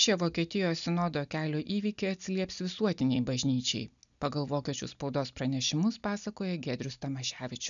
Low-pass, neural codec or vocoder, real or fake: 7.2 kHz; none; real